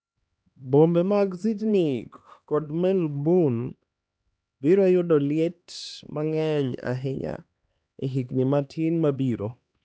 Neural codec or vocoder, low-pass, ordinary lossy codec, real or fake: codec, 16 kHz, 2 kbps, X-Codec, HuBERT features, trained on LibriSpeech; none; none; fake